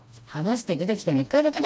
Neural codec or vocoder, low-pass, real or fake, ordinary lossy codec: codec, 16 kHz, 1 kbps, FreqCodec, smaller model; none; fake; none